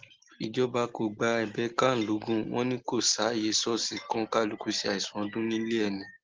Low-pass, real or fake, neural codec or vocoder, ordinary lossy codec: 7.2 kHz; real; none; Opus, 16 kbps